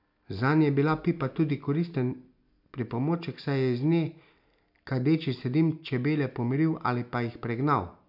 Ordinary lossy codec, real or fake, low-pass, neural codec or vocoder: none; real; 5.4 kHz; none